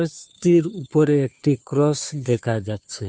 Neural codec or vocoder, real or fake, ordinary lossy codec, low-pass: codec, 16 kHz, 2 kbps, FunCodec, trained on Chinese and English, 25 frames a second; fake; none; none